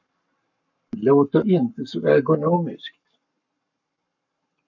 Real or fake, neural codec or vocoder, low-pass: fake; codec, 44.1 kHz, 7.8 kbps, Pupu-Codec; 7.2 kHz